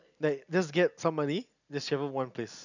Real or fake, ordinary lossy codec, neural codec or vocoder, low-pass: real; none; none; 7.2 kHz